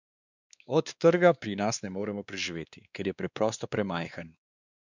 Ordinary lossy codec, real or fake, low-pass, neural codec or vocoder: none; fake; 7.2 kHz; codec, 16 kHz, 2 kbps, X-Codec, WavLM features, trained on Multilingual LibriSpeech